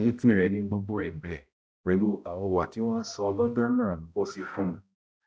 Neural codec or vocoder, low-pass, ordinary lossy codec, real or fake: codec, 16 kHz, 0.5 kbps, X-Codec, HuBERT features, trained on balanced general audio; none; none; fake